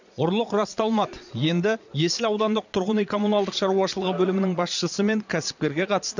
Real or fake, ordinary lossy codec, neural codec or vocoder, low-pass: fake; none; vocoder, 22.05 kHz, 80 mel bands, Vocos; 7.2 kHz